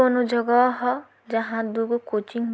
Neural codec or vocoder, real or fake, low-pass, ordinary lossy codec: none; real; none; none